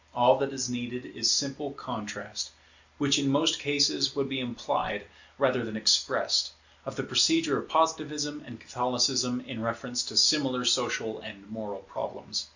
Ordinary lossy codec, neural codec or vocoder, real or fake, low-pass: Opus, 64 kbps; none; real; 7.2 kHz